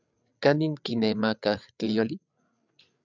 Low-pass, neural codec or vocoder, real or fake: 7.2 kHz; codec, 16 kHz, 8 kbps, FreqCodec, larger model; fake